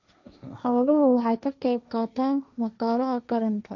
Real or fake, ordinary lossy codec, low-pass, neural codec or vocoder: fake; none; 7.2 kHz; codec, 16 kHz, 1.1 kbps, Voila-Tokenizer